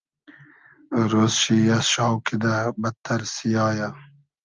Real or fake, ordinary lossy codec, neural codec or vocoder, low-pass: real; Opus, 24 kbps; none; 7.2 kHz